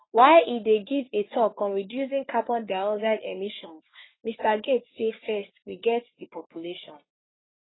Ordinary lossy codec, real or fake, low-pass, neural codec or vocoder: AAC, 16 kbps; fake; 7.2 kHz; codec, 44.1 kHz, 3.4 kbps, Pupu-Codec